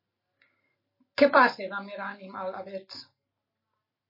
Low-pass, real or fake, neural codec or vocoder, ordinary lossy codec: 5.4 kHz; real; none; MP3, 24 kbps